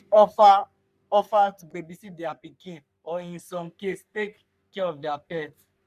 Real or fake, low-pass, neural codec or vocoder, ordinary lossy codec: fake; 14.4 kHz; codec, 44.1 kHz, 3.4 kbps, Pupu-Codec; none